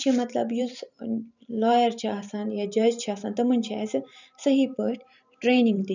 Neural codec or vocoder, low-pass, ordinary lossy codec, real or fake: vocoder, 44.1 kHz, 128 mel bands every 512 samples, BigVGAN v2; 7.2 kHz; none; fake